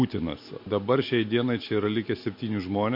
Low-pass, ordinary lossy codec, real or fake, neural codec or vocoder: 5.4 kHz; MP3, 32 kbps; real; none